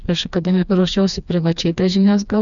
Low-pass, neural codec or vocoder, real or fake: 7.2 kHz; codec, 16 kHz, 2 kbps, FreqCodec, smaller model; fake